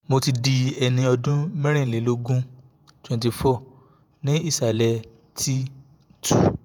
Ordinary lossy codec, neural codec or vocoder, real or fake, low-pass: none; vocoder, 48 kHz, 128 mel bands, Vocos; fake; none